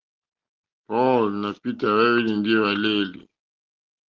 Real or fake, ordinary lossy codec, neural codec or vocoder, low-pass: real; Opus, 16 kbps; none; 7.2 kHz